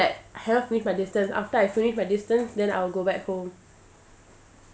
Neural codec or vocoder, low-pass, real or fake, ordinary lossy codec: none; none; real; none